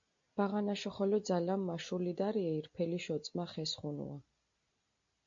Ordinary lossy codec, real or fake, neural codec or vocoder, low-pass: MP3, 48 kbps; real; none; 7.2 kHz